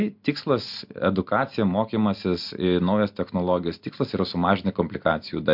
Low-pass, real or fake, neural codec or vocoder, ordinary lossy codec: 5.4 kHz; real; none; MP3, 48 kbps